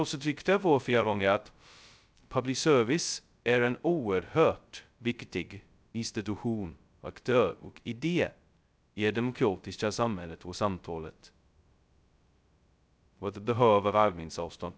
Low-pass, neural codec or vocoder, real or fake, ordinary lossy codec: none; codec, 16 kHz, 0.2 kbps, FocalCodec; fake; none